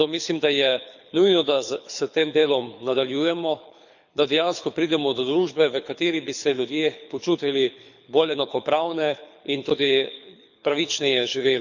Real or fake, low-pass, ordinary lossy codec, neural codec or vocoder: fake; 7.2 kHz; none; codec, 24 kHz, 6 kbps, HILCodec